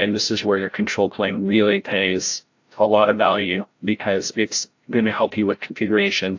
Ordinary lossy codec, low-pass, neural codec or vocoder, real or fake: AAC, 48 kbps; 7.2 kHz; codec, 16 kHz, 0.5 kbps, FreqCodec, larger model; fake